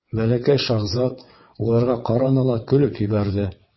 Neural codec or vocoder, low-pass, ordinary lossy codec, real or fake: vocoder, 22.05 kHz, 80 mel bands, WaveNeXt; 7.2 kHz; MP3, 24 kbps; fake